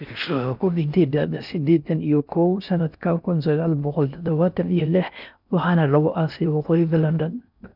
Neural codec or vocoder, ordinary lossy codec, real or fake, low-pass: codec, 16 kHz in and 24 kHz out, 0.6 kbps, FocalCodec, streaming, 4096 codes; none; fake; 5.4 kHz